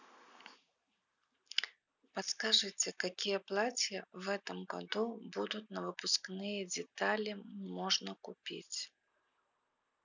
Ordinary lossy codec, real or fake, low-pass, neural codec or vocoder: none; real; 7.2 kHz; none